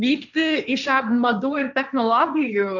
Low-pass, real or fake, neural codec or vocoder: 7.2 kHz; fake; codec, 16 kHz, 1.1 kbps, Voila-Tokenizer